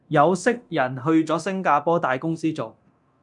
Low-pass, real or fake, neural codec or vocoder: 10.8 kHz; fake; codec, 24 kHz, 0.9 kbps, DualCodec